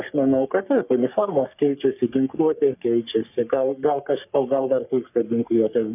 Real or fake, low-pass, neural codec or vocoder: fake; 3.6 kHz; codec, 44.1 kHz, 3.4 kbps, Pupu-Codec